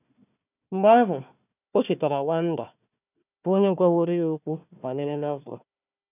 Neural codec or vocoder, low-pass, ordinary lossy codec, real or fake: codec, 16 kHz, 1 kbps, FunCodec, trained on Chinese and English, 50 frames a second; 3.6 kHz; none; fake